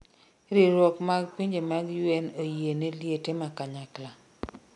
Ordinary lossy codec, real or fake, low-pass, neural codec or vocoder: none; real; 10.8 kHz; none